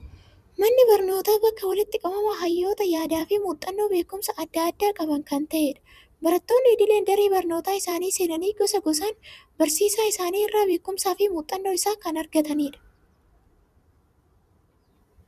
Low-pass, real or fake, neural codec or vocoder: 14.4 kHz; fake; vocoder, 44.1 kHz, 128 mel bands every 256 samples, BigVGAN v2